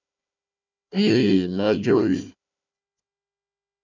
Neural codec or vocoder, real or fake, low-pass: codec, 16 kHz, 1 kbps, FunCodec, trained on Chinese and English, 50 frames a second; fake; 7.2 kHz